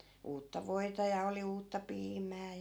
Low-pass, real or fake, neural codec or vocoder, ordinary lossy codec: none; real; none; none